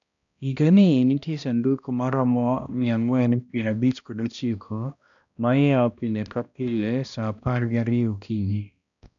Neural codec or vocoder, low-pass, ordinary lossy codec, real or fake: codec, 16 kHz, 1 kbps, X-Codec, HuBERT features, trained on balanced general audio; 7.2 kHz; none; fake